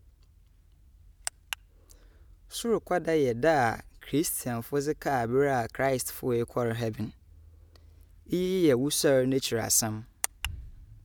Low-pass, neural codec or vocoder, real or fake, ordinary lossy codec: none; none; real; none